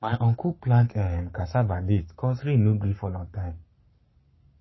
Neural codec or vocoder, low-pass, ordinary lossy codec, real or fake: codec, 44.1 kHz, 7.8 kbps, Pupu-Codec; 7.2 kHz; MP3, 24 kbps; fake